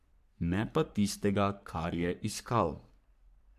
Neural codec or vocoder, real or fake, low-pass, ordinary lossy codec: codec, 44.1 kHz, 3.4 kbps, Pupu-Codec; fake; 14.4 kHz; AAC, 96 kbps